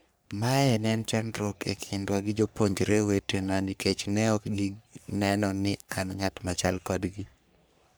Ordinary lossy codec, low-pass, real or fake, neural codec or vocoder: none; none; fake; codec, 44.1 kHz, 3.4 kbps, Pupu-Codec